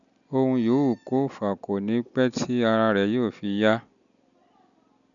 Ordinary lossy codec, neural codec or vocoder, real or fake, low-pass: none; none; real; 7.2 kHz